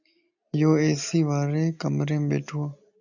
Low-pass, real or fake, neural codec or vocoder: 7.2 kHz; real; none